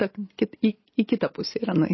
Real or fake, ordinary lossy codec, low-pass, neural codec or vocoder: real; MP3, 24 kbps; 7.2 kHz; none